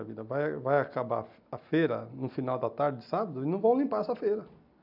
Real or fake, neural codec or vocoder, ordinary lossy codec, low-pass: real; none; none; 5.4 kHz